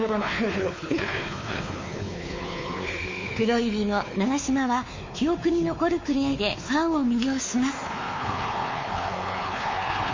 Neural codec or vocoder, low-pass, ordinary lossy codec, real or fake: codec, 16 kHz, 4 kbps, X-Codec, WavLM features, trained on Multilingual LibriSpeech; 7.2 kHz; MP3, 32 kbps; fake